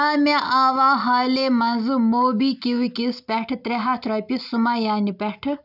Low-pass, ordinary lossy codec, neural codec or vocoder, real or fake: 5.4 kHz; none; none; real